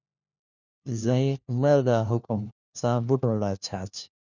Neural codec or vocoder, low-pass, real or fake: codec, 16 kHz, 1 kbps, FunCodec, trained on LibriTTS, 50 frames a second; 7.2 kHz; fake